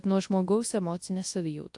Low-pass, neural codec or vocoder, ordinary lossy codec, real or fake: 10.8 kHz; codec, 24 kHz, 0.9 kbps, WavTokenizer, large speech release; AAC, 64 kbps; fake